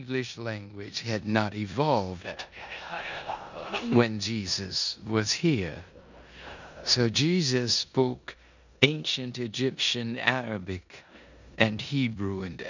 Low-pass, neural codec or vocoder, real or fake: 7.2 kHz; codec, 16 kHz in and 24 kHz out, 0.9 kbps, LongCat-Audio-Codec, four codebook decoder; fake